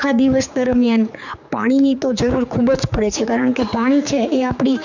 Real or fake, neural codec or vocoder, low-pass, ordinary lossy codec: fake; codec, 16 kHz, 4 kbps, X-Codec, HuBERT features, trained on general audio; 7.2 kHz; none